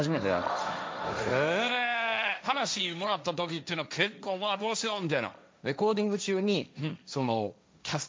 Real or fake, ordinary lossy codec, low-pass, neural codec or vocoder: fake; none; none; codec, 16 kHz, 1.1 kbps, Voila-Tokenizer